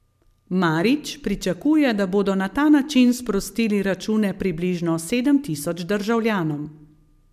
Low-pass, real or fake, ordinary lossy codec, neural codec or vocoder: 14.4 kHz; real; MP3, 96 kbps; none